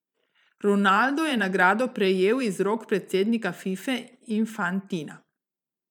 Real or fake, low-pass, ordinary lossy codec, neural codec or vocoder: fake; 19.8 kHz; none; vocoder, 44.1 kHz, 128 mel bands every 512 samples, BigVGAN v2